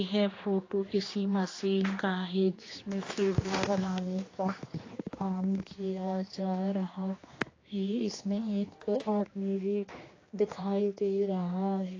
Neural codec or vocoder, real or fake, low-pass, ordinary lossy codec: codec, 16 kHz, 2 kbps, X-Codec, HuBERT features, trained on general audio; fake; 7.2 kHz; AAC, 32 kbps